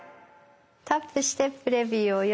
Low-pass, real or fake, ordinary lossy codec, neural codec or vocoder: none; real; none; none